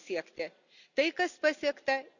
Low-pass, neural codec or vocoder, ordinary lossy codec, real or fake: 7.2 kHz; none; none; real